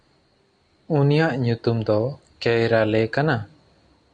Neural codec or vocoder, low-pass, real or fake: none; 9.9 kHz; real